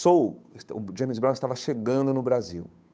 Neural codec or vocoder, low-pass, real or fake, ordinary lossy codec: codec, 16 kHz, 8 kbps, FunCodec, trained on Chinese and English, 25 frames a second; none; fake; none